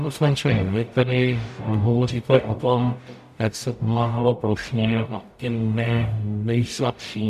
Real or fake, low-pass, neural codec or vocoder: fake; 14.4 kHz; codec, 44.1 kHz, 0.9 kbps, DAC